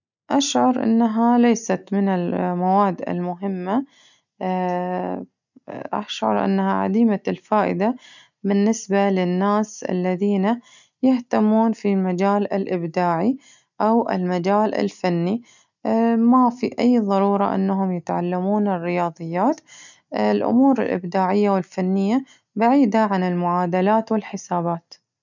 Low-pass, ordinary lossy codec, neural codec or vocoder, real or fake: 7.2 kHz; none; none; real